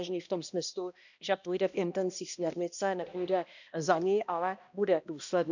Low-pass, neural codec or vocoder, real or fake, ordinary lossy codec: 7.2 kHz; codec, 16 kHz, 1 kbps, X-Codec, HuBERT features, trained on balanced general audio; fake; none